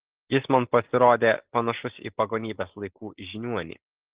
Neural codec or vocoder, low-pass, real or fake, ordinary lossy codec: none; 3.6 kHz; real; Opus, 16 kbps